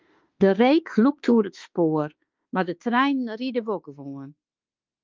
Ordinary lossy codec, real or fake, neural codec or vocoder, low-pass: Opus, 24 kbps; fake; autoencoder, 48 kHz, 32 numbers a frame, DAC-VAE, trained on Japanese speech; 7.2 kHz